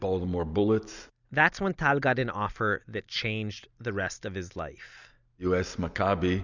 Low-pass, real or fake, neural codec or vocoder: 7.2 kHz; real; none